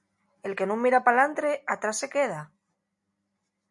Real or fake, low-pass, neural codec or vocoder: real; 10.8 kHz; none